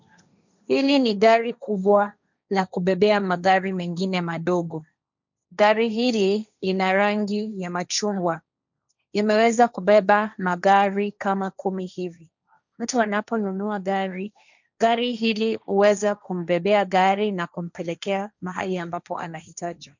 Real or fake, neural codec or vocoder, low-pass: fake; codec, 16 kHz, 1.1 kbps, Voila-Tokenizer; 7.2 kHz